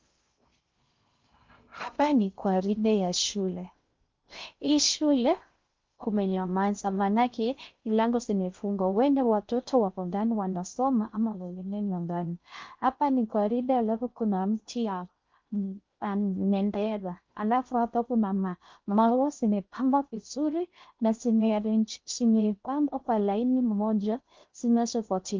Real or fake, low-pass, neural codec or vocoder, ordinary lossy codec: fake; 7.2 kHz; codec, 16 kHz in and 24 kHz out, 0.6 kbps, FocalCodec, streaming, 2048 codes; Opus, 24 kbps